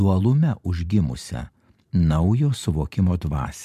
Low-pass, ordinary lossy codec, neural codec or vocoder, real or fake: 14.4 kHz; MP3, 96 kbps; vocoder, 44.1 kHz, 128 mel bands every 512 samples, BigVGAN v2; fake